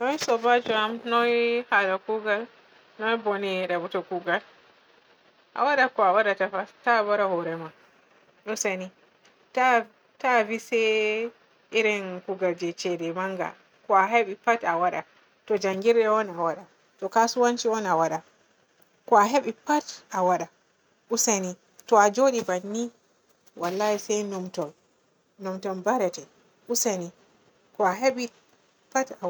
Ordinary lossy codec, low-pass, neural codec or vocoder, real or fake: none; none; none; real